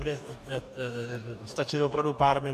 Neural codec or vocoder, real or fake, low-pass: codec, 44.1 kHz, 2.6 kbps, DAC; fake; 14.4 kHz